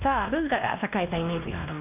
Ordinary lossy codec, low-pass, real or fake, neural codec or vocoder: none; 3.6 kHz; fake; codec, 16 kHz, 1 kbps, X-Codec, WavLM features, trained on Multilingual LibriSpeech